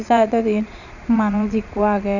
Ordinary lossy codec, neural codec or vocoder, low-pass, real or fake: none; vocoder, 22.05 kHz, 80 mel bands, Vocos; 7.2 kHz; fake